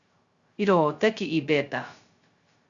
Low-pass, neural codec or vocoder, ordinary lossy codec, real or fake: 7.2 kHz; codec, 16 kHz, 0.2 kbps, FocalCodec; Opus, 64 kbps; fake